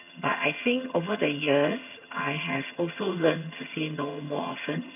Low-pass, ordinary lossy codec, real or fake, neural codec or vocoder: 3.6 kHz; none; fake; vocoder, 22.05 kHz, 80 mel bands, HiFi-GAN